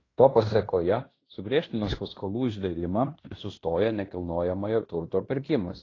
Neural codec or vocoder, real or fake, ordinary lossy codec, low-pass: codec, 16 kHz in and 24 kHz out, 0.9 kbps, LongCat-Audio-Codec, fine tuned four codebook decoder; fake; AAC, 32 kbps; 7.2 kHz